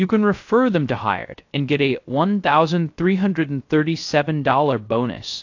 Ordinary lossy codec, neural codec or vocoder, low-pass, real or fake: AAC, 48 kbps; codec, 16 kHz, 0.3 kbps, FocalCodec; 7.2 kHz; fake